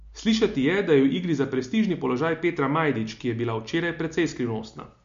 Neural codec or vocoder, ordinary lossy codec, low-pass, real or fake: none; MP3, 48 kbps; 7.2 kHz; real